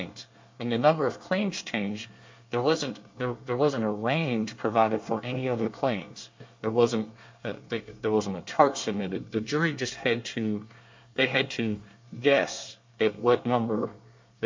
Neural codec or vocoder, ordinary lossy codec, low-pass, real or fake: codec, 24 kHz, 1 kbps, SNAC; MP3, 48 kbps; 7.2 kHz; fake